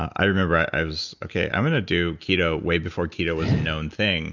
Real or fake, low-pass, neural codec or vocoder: real; 7.2 kHz; none